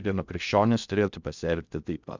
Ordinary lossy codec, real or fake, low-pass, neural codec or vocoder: Opus, 64 kbps; fake; 7.2 kHz; codec, 16 kHz in and 24 kHz out, 0.6 kbps, FocalCodec, streaming, 2048 codes